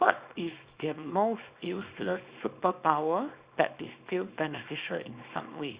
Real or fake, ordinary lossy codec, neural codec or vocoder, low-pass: fake; Opus, 64 kbps; codec, 24 kHz, 0.9 kbps, WavTokenizer, small release; 3.6 kHz